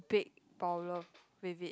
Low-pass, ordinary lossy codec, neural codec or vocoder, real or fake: none; none; none; real